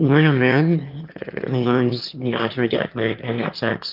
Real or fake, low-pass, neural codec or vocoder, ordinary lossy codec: fake; 5.4 kHz; autoencoder, 22.05 kHz, a latent of 192 numbers a frame, VITS, trained on one speaker; Opus, 24 kbps